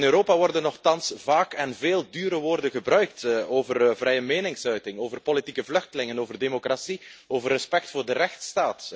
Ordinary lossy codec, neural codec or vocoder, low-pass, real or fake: none; none; none; real